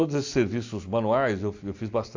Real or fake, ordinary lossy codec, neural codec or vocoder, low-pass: real; AAC, 48 kbps; none; 7.2 kHz